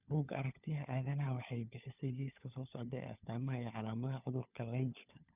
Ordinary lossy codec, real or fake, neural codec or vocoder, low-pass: none; fake; codec, 16 kHz, 4 kbps, FunCodec, trained on LibriTTS, 50 frames a second; 3.6 kHz